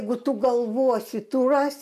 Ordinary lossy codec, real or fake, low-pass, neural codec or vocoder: AAC, 96 kbps; real; 14.4 kHz; none